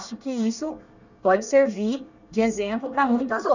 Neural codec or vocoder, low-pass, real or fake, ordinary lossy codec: codec, 24 kHz, 1 kbps, SNAC; 7.2 kHz; fake; none